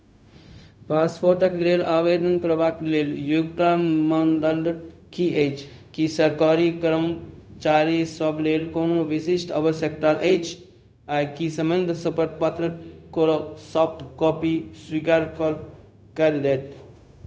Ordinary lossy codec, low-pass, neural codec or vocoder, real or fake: none; none; codec, 16 kHz, 0.4 kbps, LongCat-Audio-Codec; fake